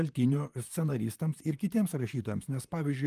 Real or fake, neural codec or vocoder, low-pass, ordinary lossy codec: fake; vocoder, 44.1 kHz, 128 mel bands, Pupu-Vocoder; 14.4 kHz; Opus, 32 kbps